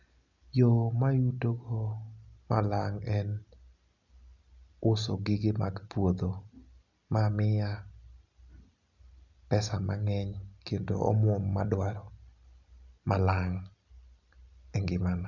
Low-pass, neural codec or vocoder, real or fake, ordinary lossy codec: 7.2 kHz; none; real; none